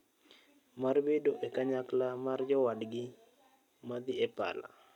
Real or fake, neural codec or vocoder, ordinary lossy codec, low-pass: real; none; none; 19.8 kHz